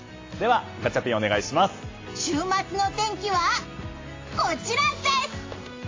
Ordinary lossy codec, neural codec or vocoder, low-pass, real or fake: AAC, 32 kbps; none; 7.2 kHz; real